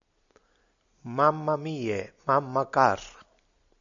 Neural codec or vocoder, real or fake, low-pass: none; real; 7.2 kHz